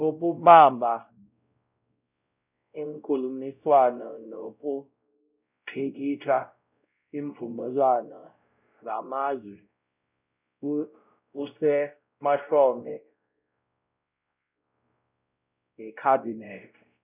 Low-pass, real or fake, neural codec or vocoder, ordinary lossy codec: 3.6 kHz; fake; codec, 16 kHz, 0.5 kbps, X-Codec, WavLM features, trained on Multilingual LibriSpeech; none